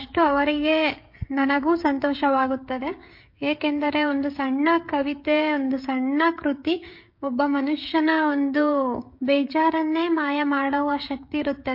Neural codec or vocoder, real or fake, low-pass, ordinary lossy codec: codec, 16 kHz, 16 kbps, FreqCodec, smaller model; fake; 5.4 kHz; MP3, 32 kbps